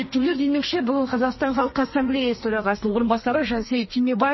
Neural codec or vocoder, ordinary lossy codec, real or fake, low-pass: codec, 32 kHz, 1.9 kbps, SNAC; MP3, 24 kbps; fake; 7.2 kHz